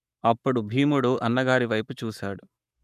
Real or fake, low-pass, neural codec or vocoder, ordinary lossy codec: fake; 14.4 kHz; codec, 44.1 kHz, 7.8 kbps, Pupu-Codec; none